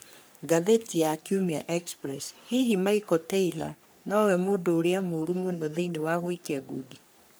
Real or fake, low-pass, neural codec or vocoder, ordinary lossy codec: fake; none; codec, 44.1 kHz, 3.4 kbps, Pupu-Codec; none